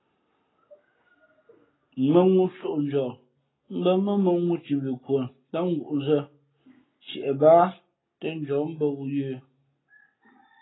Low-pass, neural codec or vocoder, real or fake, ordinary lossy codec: 7.2 kHz; none; real; AAC, 16 kbps